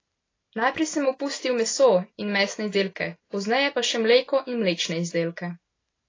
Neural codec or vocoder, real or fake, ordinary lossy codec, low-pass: none; real; AAC, 32 kbps; 7.2 kHz